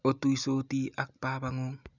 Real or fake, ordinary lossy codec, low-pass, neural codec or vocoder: real; none; 7.2 kHz; none